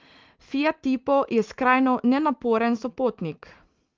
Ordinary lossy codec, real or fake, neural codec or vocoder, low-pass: Opus, 32 kbps; real; none; 7.2 kHz